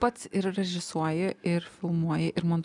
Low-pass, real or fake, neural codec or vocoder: 10.8 kHz; real; none